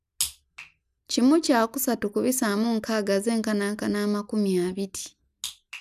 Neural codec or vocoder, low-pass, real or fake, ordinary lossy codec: none; 14.4 kHz; real; none